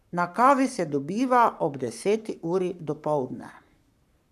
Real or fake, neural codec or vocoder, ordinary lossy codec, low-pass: fake; codec, 44.1 kHz, 7.8 kbps, Pupu-Codec; none; 14.4 kHz